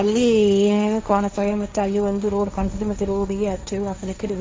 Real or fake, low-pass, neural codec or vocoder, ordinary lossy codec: fake; none; codec, 16 kHz, 1.1 kbps, Voila-Tokenizer; none